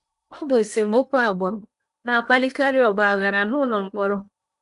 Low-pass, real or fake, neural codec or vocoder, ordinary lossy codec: 10.8 kHz; fake; codec, 16 kHz in and 24 kHz out, 0.8 kbps, FocalCodec, streaming, 65536 codes; none